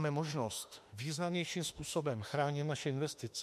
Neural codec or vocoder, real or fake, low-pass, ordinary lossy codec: autoencoder, 48 kHz, 32 numbers a frame, DAC-VAE, trained on Japanese speech; fake; 14.4 kHz; MP3, 64 kbps